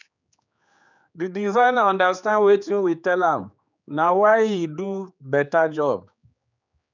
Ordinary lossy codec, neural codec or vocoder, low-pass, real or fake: none; codec, 16 kHz, 4 kbps, X-Codec, HuBERT features, trained on general audio; 7.2 kHz; fake